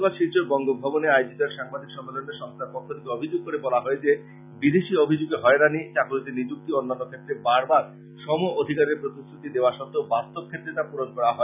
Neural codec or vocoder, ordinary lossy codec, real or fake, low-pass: none; none; real; 3.6 kHz